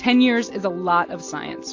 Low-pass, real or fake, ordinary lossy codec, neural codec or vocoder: 7.2 kHz; real; AAC, 48 kbps; none